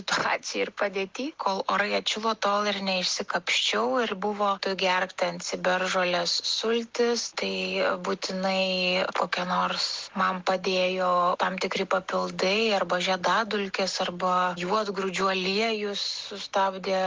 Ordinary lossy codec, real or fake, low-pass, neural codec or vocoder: Opus, 16 kbps; real; 7.2 kHz; none